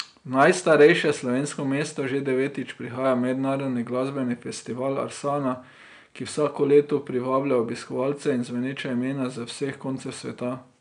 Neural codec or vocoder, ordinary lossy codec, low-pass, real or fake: none; none; 9.9 kHz; real